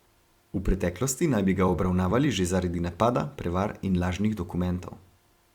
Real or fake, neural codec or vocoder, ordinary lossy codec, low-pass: fake; vocoder, 44.1 kHz, 128 mel bands every 256 samples, BigVGAN v2; Opus, 64 kbps; 19.8 kHz